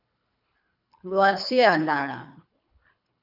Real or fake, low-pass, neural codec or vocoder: fake; 5.4 kHz; codec, 24 kHz, 3 kbps, HILCodec